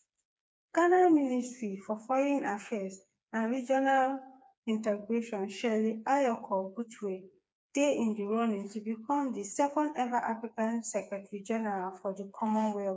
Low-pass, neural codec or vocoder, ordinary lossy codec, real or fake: none; codec, 16 kHz, 4 kbps, FreqCodec, smaller model; none; fake